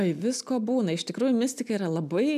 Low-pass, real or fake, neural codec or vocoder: 14.4 kHz; real; none